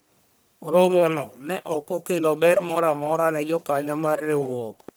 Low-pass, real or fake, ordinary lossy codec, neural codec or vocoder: none; fake; none; codec, 44.1 kHz, 1.7 kbps, Pupu-Codec